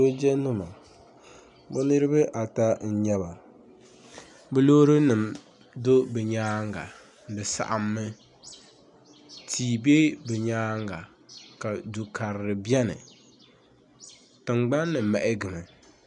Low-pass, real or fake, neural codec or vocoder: 10.8 kHz; real; none